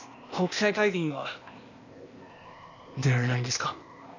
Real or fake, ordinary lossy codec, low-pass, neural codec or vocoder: fake; none; 7.2 kHz; codec, 16 kHz, 0.8 kbps, ZipCodec